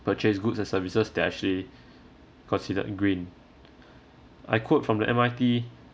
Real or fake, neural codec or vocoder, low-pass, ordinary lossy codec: real; none; none; none